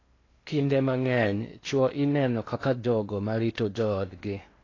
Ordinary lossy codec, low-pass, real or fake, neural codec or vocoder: AAC, 32 kbps; 7.2 kHz; fake; codec, 16 kHz in and 24 kHz out, 0.8 kbps, FocalCodec, streaming, 65536 codes